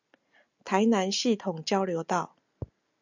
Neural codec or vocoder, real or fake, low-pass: none; real; 7.2 kHz